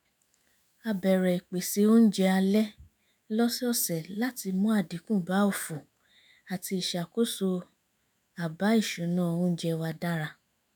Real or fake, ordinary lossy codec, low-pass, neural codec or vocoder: fake; none; none; autoencoder, 48 kHz, 128 numbers a frame, DAC-VAE, trained on Japanese speech